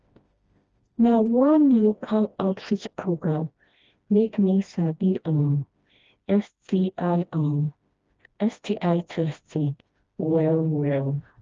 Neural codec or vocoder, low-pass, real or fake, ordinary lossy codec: codec, 16 kHz, 1 kbps, FreqCodec, smaller model; 7.2 kHz; fake; Opus, 24 kbps